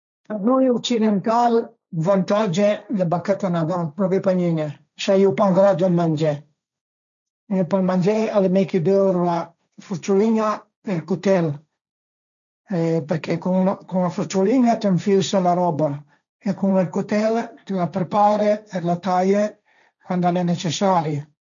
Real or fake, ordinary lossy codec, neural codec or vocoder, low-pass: fake; none; codec, 16 kHz, 1.1 kbps, Voila-Tokenizer; 7.2 kHz